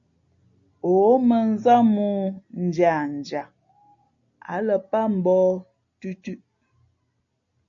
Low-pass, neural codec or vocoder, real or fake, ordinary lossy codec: 7.2 kHz; none; real; MP3, 48 kbps